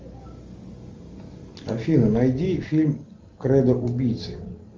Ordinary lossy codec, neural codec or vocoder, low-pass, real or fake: Opus, 32 kbps; none; 7.2 kHz; real